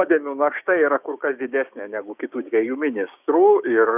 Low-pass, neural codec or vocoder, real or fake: 3.6 kHz; codec, 16 kHz, 6 kbps, DAC; fake